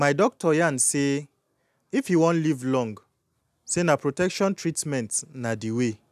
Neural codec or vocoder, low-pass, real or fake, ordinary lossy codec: none; 14.4 kHz; real; none